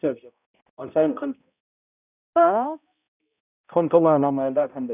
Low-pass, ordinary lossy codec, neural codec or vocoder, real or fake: 3.6 kHz; none; codec, 16 kHz, 0.5 kbps, X-Codec, HuBERT features, trained on balanced general audio; fake